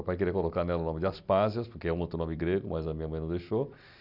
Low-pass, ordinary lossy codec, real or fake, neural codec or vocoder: 5.4 kHz; none; real; none